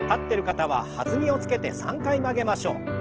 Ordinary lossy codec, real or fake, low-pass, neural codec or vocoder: Opus, 16 kbps; real; 7.2 kHz; none